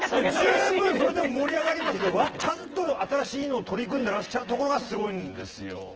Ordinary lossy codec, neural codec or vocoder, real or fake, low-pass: Opus, 16 kbps; vocoder, 24 kHz, 100 mel bands, Vocos; fake; 7.2 kHz